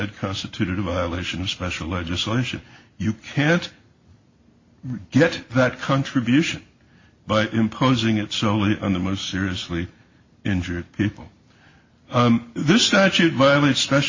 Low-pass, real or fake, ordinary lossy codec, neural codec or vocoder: 7.2 kHz; real; MP3, 32 kbps; none